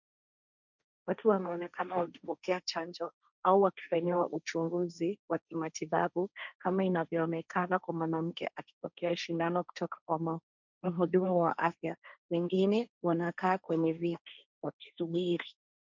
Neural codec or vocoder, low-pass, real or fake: codec, 16 kHz, 1.1 kbps, Voila-Tokenizer; 7.2 kHz; fake